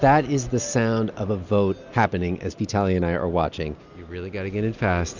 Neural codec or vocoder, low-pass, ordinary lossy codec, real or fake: none; 7.2 kHz; Opus, 64 kbps; real